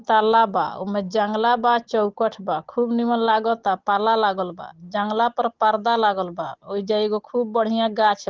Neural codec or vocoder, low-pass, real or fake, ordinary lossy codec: none; 7.2 kHz; real; Opus, 16 kbps